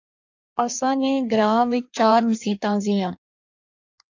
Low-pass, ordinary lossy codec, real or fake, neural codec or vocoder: 7.2 kHz; AAC, 48 kbps; fake; codec, 16 kHz in and 24 kHz out, 1.1 kbps, FireRedTTS-2 codec